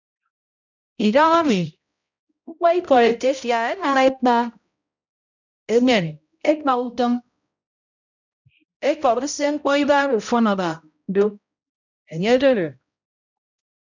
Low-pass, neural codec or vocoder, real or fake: 7.2 kHz; codec, 16 kHz, 0.5 kbps, X-Codec, HuBERT features, trained on balanced general audio; fake